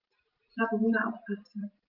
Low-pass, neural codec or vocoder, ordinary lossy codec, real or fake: 5.4 kHz; vocoder, 44.1 kHz, 128 mel bands every 512 samples, BigVGAN v2; Opus, 24 kbps; fake